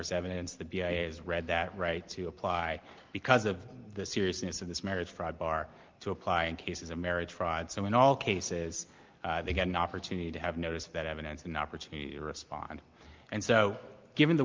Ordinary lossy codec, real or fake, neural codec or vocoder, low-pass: Opus, 32 kbps; real; none; 7.2 kHz